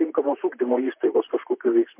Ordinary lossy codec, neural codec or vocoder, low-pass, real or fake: MP3, 32 kbps; vocoder, 44.1 kHz, 128 mel bands, Pupu-Vocoder; 3.6 kHz; fake